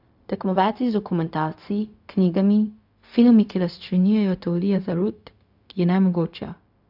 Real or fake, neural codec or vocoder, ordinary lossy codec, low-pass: fake; codec, 16 kHz, 0.4 kbps, LongCat-Audio-Codec; AAC, 48 kbps; 5.4 kHz